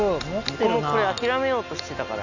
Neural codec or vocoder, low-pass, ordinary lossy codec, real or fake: none; 7.2 kHz; none; real